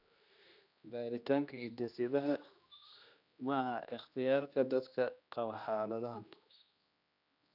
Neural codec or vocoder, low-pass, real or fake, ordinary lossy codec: codec, 16 kHz, 1 kbps, X-Codec, HuBERT features, trained on general audio; 5.4 kHz; fake; none